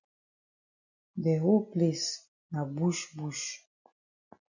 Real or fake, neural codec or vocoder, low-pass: real; none; 7.2 kHz